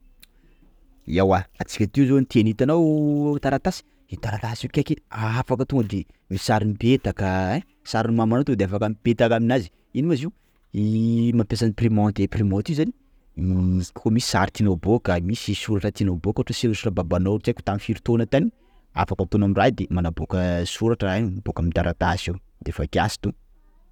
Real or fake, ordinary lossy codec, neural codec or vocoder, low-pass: real; none; none; 19.8 kHz